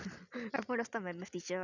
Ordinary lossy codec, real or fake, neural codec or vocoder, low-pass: none; real; none; 7.2 kHz